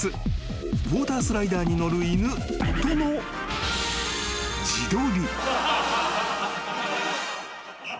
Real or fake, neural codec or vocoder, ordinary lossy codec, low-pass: real; none; none; none